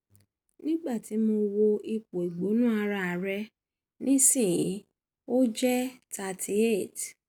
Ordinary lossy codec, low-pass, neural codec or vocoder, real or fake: none; none; none; real